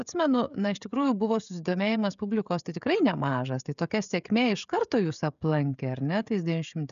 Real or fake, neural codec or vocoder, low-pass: fake; codec, 16 kHz, 16 kbps, FreqCodec, smaller model; 7.2 kHz